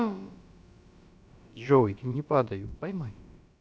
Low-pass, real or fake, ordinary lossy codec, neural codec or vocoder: none; fake; none; codec, 16 kHz, about 1 kbps, DyCAST, with the encoder's durations